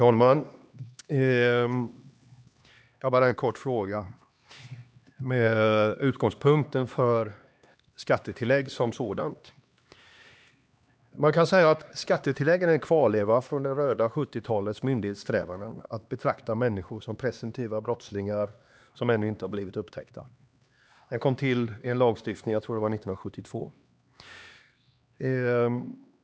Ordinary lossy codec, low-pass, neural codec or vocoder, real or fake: none; none; codec, 16 kHz, 2 kbps, X-Codec, HuBERT features, trained on LibriSpeech; fake